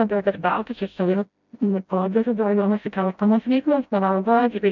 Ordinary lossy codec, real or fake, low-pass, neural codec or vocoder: MP3, 64 kbps; fake; 7.2 kHz; codec, 16 kHz, 0.5 kbps, FreqCodec, smaller model